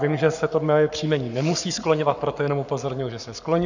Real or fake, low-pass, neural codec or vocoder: fake; 7.2 kHz; codec, 44.1 kHz, 7.8 kbps, Pupu-Codec